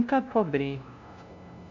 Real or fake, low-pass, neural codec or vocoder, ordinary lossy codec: fake; 7.2 kHz; codec, 16 kHz, 0.5 kbps, FunCodec, trained on LibriTTS, 25 frames a second; none